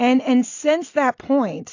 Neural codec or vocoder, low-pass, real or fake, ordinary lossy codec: none; 7.2 kHz; real; AAC, 48 kbps